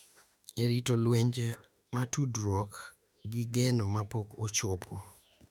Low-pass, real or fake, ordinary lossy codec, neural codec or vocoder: 19.8 kHz; fake; none; autoencoder, 48 kHz, 32 numbers a frame, DAC-VAE, trained on Japanese speech